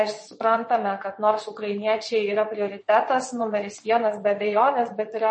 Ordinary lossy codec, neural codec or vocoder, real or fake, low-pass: MP3, 32 kbps; vocoder, 22.05 kHz, 80 mel bands, WaveNeXt; fake; 9.9 kHz